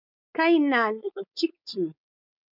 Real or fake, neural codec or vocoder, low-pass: fake; codec, 16 kHz, 4.8 kbps, FACodec; 5.4 kHz